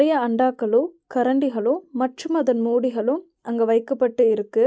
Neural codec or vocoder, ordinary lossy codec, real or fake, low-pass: none; none; real; none